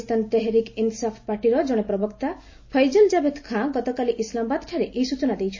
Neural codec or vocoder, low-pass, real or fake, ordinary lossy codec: none; 7.2 kHz; real; MP3, 32 kbps